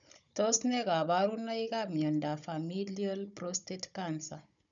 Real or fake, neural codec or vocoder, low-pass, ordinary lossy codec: fake; codec, 16 kHz, 16 kbps, FunCodec, trained on Chinese and English, 50 frames a second; 7.2 kHz; none